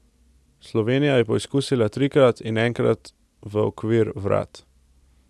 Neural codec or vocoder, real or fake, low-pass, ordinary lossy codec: none; real; none; none